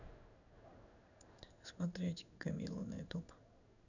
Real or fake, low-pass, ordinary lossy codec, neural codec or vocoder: fake; 7.2 kHz; none; codec, 16 kHz in and 24 kHz out, 1 kbps, XY-Tokenizer